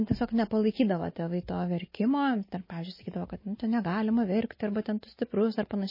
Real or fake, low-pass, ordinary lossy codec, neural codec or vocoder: real; 5.4 kHz; MP3, 24 kbps; none